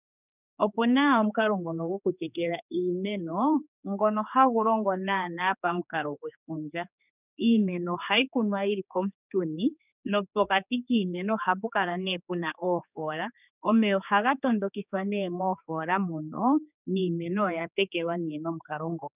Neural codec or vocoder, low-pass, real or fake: codec, 16 kHz, 4 kbps, X-Codec, HuBERT features, trained on general audio; 3.6 kHz; fake